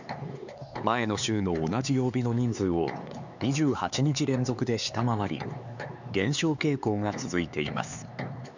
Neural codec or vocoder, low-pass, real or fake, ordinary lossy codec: codec, 16 kHz, 4 kbps, X-Codec, HuBERT features, trained on LibriSpeech; 7.2 kHz; fake; none